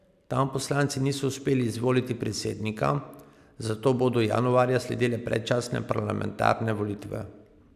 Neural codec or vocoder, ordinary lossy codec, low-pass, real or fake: none; none; 14.4 kHz; real